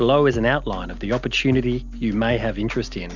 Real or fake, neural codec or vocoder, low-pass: real; none; 7.2 kHz